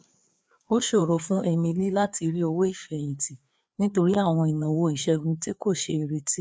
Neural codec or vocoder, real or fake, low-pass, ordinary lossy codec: codec, 16 kHz, 4 kbps, FreqCodec, larger model; fake; none; none